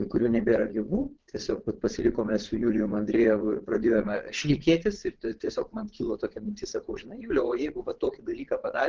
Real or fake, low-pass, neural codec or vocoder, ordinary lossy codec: fake; 7.2 kHz; vocoder, 22.05 kHz, 80 mel bands, WaveNeXt; Opus, 16 kbps